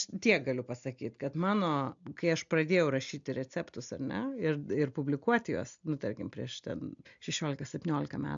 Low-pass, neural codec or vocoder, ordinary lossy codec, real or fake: 7.2 kHz; none; MP3, 64 kbps; real